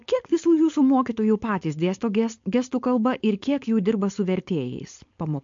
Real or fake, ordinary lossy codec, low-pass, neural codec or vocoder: fake; MP3, 48 kbps; 7.2 kHz; codec, 16 kHz, 4.8 kbps, FACodec